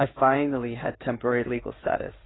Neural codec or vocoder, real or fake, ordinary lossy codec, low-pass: codec, 16 kHz in and 24 kHz out, 2.2 kbps, FireRedTTS-2 codec; fake; AAC, 16 kbps; 7.2 kHz